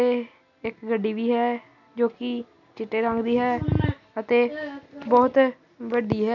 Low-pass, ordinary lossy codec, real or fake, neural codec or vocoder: 7.2 kHz; none; real; none